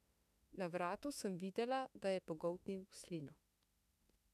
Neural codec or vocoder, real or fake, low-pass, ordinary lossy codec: autoencoder, 48 kHz, 32 numbers a frame, DAC-VAE, trained on Japanese speech; fake; 14.4 kHz; none